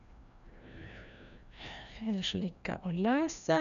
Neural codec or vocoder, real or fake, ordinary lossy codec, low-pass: codec, 16 kHz, 1 kbps, FreqCodec, larger model; fake; none; 7.2 kHz